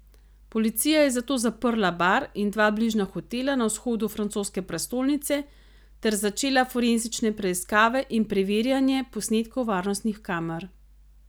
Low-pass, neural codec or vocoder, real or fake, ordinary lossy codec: none; none; real; none